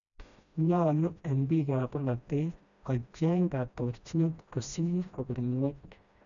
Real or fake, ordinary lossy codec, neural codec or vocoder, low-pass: fake; none; codec, 16 kHz, 1 kbps, FreqCodec, smaller model; 7.2 kHz